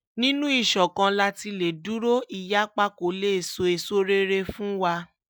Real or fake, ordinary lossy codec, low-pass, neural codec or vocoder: real; none; none; none